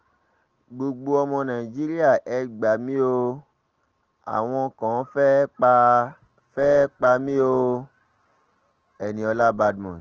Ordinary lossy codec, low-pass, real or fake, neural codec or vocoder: Opus, 16 kbps; 7.2 kHz; real; none